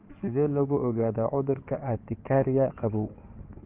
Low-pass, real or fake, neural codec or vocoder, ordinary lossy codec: 3.6 kHz; fake; codec, 16 kHz in and 24 kHz out, 2.2 kbps, FireRedTTS-2 codec; Opus, 24 kbps